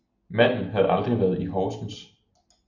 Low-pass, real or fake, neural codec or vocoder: 7.2 kHz; real; none